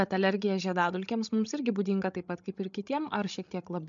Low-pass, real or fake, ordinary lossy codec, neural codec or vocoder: 7.2 kHz; fake; MP3, 64 kbps; codec, 16 kHz, 16 kbps, FreqCodec, larger model